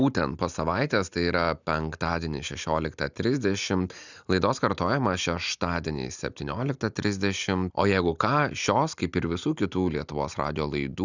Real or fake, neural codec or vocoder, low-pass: real; none; 7.2 kHz